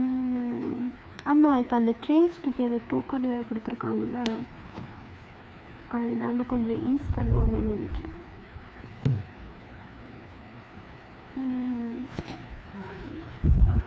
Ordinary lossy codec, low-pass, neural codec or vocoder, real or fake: none; none; codec, 16 kHz, 2 kbps, FreqCodec, larger model; fake